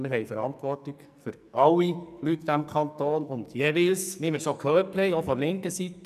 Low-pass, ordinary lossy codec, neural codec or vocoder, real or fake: 14.4 kHz; none; codec, 44.1 kHz, 2.6 kbps, SNAC; fake